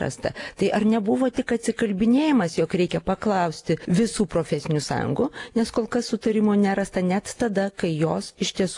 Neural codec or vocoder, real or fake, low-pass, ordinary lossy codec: none; real; 10.8 kHz; AAC, 48 kbps